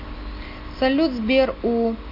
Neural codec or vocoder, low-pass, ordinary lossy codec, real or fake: none; 5.4 kHz; AAC, 32 kbps; real